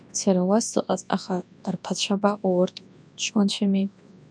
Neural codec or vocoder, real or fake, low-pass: codec, 24 kHz, 0.9 kbps, WavTokenizer, large speech release; fake; 9.9 kHz